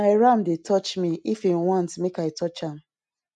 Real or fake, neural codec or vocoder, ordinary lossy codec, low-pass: real; none; none; 10.8 kHz